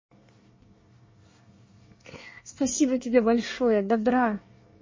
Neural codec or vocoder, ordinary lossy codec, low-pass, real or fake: codec, 24 kHz, 1 kbps, SNAC; MP3, 32 kbps; 7.2 kHz; fake